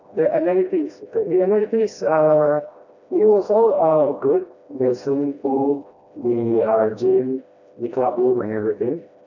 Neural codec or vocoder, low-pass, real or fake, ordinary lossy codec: codec, 16 kHz, 1 kbps, FreqCodec, smaller model; 7.2 kHz; fake; none